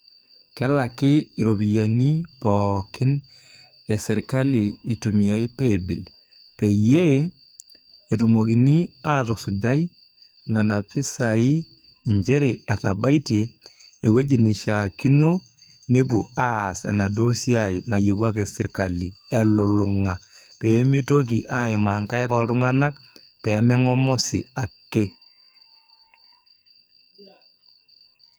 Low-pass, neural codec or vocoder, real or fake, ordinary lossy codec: none; codec, 44.1 kHz, 2.6 kbps, SNAC; fake; none